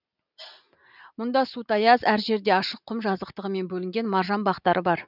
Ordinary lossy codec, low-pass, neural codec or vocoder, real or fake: none; 5.4 kHz; none; real